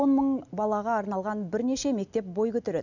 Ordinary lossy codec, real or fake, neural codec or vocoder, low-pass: none; real; none; 7.2 kHz